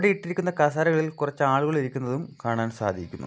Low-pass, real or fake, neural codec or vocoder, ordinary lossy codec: none; real; none; none